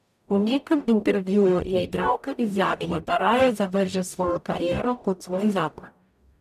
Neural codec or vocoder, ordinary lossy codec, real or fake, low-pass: codec, 44.1 kHz, 0.9 kbps, DAC; none; fake; 14.4 kHz